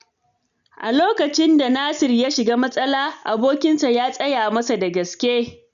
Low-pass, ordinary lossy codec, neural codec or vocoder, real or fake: 7.2 kHz; MP3, 96 kbps; none; real